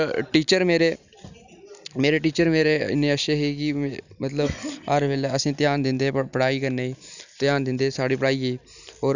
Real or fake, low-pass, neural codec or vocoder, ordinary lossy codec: real; 7.2 kHz; none; none